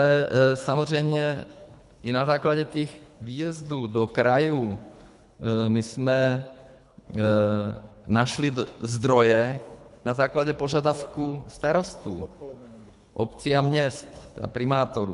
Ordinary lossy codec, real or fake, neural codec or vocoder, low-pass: MP3, 96 kbps; fake; codec, 24 kHz, 3 kbps, HILCodec; 10.8 kHz